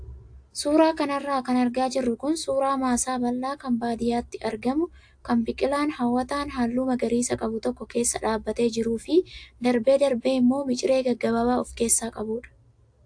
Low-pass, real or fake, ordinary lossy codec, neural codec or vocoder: 9.9 kHz; real; AAC, 64 kbps; none